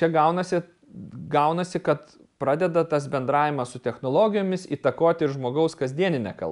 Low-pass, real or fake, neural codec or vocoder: 10.8 kHz; real; none